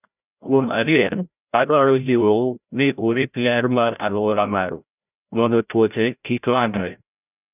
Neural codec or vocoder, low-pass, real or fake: codec, 16 kHz, 0.5 kbps, FreqCodec, larger model; 3.6 kHz; fake